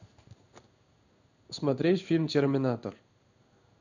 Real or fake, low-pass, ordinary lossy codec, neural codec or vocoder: fake; 7.2 kHz; none; codec, 16 kHz in and 24 kHz out, 1 kbps, XY-Tokenizer